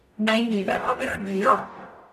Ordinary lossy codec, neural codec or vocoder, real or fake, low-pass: none; codec, 44.1 kHz, 0.9 kbps, DAC; fake; 14.4 kHz